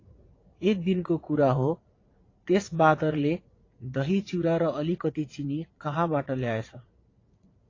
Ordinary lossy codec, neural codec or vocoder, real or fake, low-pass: AAC, 32 kbps; vocoder, 44.1 kHz, 80 mel bands, Vocos; fake; 7.2 kHz